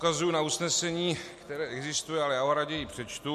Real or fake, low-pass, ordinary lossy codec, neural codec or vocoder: real; 14.4 kHz; MP3, 64 kbps; none